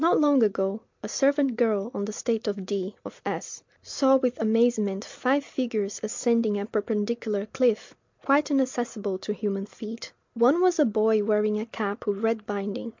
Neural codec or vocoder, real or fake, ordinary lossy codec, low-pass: none; real; MP3, 64 kbps; 7.2 kHz